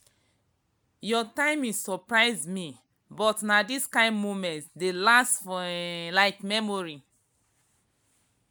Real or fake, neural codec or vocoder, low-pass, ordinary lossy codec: real; none; none; none